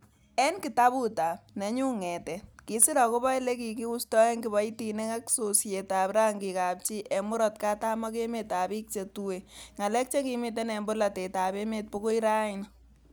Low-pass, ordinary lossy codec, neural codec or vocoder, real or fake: none; none; none; real